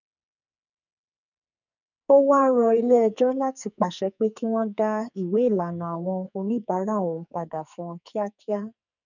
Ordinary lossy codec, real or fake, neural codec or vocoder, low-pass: none; fake; codec, 44.1 kHz, 2.6 kbps, SNAC; 7.2 kHz